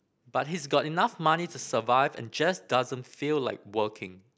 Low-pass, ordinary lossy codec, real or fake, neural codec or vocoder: none; none; real; none